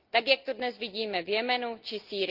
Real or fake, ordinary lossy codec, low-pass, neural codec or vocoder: real; Opus, 32 kbps; 5.4 kHz; none